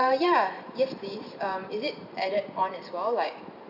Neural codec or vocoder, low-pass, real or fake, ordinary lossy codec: vocoder, 44.1 kHz, 128 mel bands every 512 samples, BigVGAN v2; 5.4 kHz; fake; none